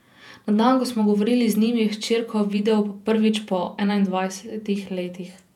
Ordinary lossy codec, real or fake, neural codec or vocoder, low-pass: none; fake; vocoder, 48 kHz, 128 mel bands, Vocos; 19.8 kHz